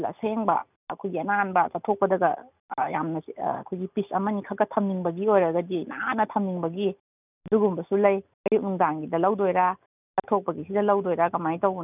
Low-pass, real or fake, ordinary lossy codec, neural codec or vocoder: 3.6 kHz; real; none; none